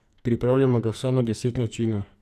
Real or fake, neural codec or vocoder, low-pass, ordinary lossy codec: fake; codec, 44.1 kHz, 2.6 kbps, SNAC; 14.4 kHz; none